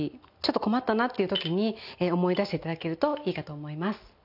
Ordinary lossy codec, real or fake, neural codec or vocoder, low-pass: none; real; none; 5.4 kHz